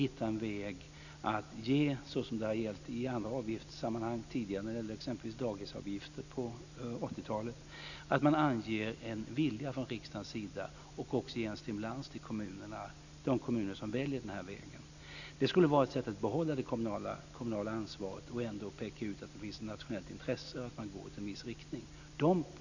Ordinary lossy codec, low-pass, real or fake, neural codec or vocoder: none; 7.2 kHz; real; none